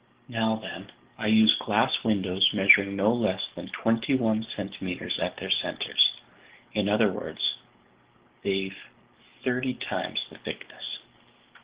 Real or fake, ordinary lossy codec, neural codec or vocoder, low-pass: fake; Opus, 16 kbps; codec, 44.1 kHz, 7.8 kbps, DAC; 3.6 kHz